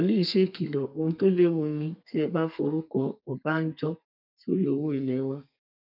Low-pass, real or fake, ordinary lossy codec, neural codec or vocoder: 5.4 kHz; fake; none; codec, 32 kHz, 1.9 kbps, SNAC